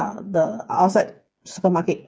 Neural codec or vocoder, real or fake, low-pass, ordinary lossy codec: codec, 16 kHz, 4 kbps, FreqCodec, smaller model; fake; none; none